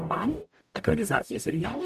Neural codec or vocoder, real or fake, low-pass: codec, 44.1 kHz, 0.9 kbps, DAC; fake; 14.4 kHz